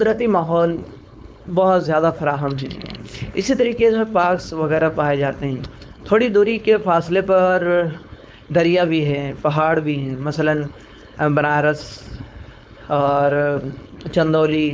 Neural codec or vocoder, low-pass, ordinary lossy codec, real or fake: codec, 16 kHz, 4.8 kbps, FACodec; none; none; fake